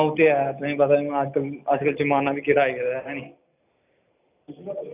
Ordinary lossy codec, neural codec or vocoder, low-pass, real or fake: none; none; 3.6 kHz; real